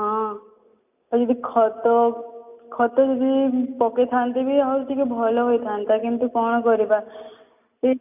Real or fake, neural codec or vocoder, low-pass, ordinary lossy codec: real; none; 3.6 kHz; none